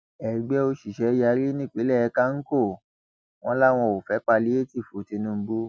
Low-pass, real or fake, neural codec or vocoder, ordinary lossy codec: none; real; none; none